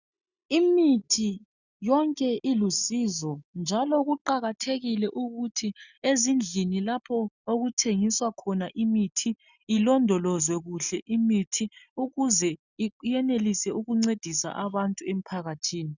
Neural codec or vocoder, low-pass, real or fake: none; 7.2 kHz; real